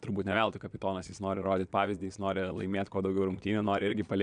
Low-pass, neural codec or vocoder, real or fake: 9.9 kHz; vocoder, 22.05 kHz, 80 mel bands, Vocos; fake